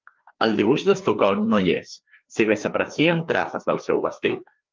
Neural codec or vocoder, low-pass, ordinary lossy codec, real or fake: codec, 16 kHz, 2 kbps, FreqCodec, larger model; 7.2 kHz; Opus, 32 kbps; fake